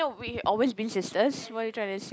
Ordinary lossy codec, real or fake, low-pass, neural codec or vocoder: none; real; none; none